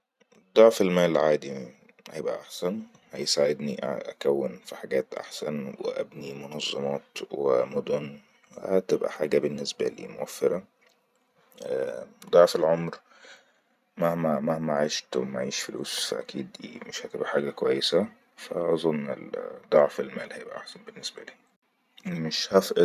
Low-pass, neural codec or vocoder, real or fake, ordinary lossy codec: 14.4 kHz; vocoder, 44.1 kHz, 128 mel bands every 512 samples, BigVGAN v2; fake; none